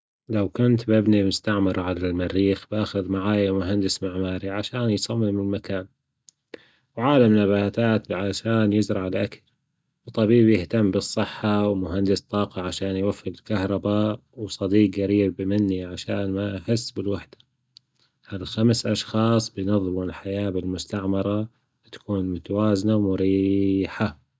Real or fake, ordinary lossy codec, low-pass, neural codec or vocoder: real; none; none; none